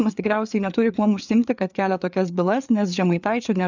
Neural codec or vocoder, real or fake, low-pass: codec, 16 kHz, 4 kbps, FreqCodec, larger model; fake; 7.2 kHz